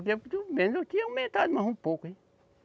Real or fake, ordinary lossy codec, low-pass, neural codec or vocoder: real; none; none; none